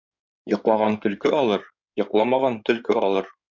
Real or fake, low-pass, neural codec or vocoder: fake; 7.2 kHz; codec, 16 kHz in and 24 kHz out, 2.2 kbps, FireRedTTS-2 codec